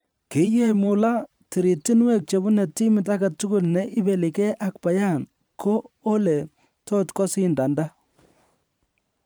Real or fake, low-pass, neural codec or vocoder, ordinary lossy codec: fake; none; vocoder, 44.1 kHz, 128 mel bands every 512 samples, BigVGAN v2; none